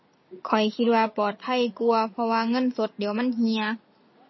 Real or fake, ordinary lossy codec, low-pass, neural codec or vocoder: real; MP3, 24 kbps; 7.2 kHz; none